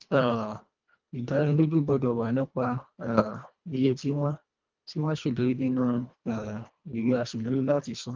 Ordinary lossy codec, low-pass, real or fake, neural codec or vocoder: Opus, 32 kbps; 7.2 kHz; fake; codec, 24 kHz, 1.5 kbps, HILCodec